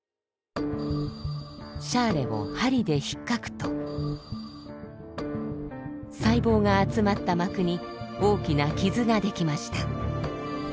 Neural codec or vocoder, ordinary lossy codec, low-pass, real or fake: none; none; none; real